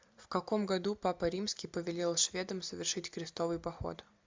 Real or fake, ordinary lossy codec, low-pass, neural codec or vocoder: real; MP3, 64 kbps; 7.2 kHz; none